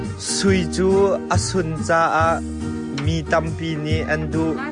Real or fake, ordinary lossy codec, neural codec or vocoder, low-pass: real; MP3, 64 kbps; none; 9.9 kHz